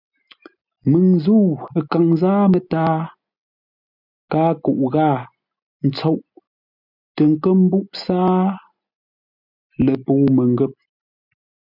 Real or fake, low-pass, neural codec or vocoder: real; 5.4 kHz; none